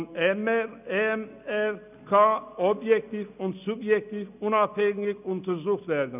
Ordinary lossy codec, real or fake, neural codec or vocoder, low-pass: none; real; none; 3.6 kHz